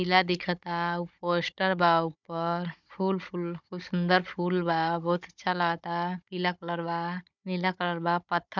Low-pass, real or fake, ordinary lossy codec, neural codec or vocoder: 7.2 kHz; fake; none; codec, 16 kHz, 16 kbps, FunCodec, trained on Chinese and English, 50 frames a second